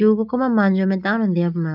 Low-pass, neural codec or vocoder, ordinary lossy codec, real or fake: 5.4 kHz; none; none; real